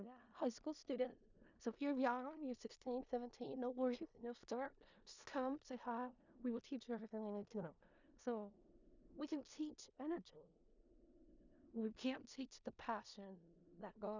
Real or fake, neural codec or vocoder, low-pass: fake; codec, 16 kHz in and 24 kHz out, 0.4 kbps, LongCat-Audio-Codec, four codebook decoder; 7.2 kHz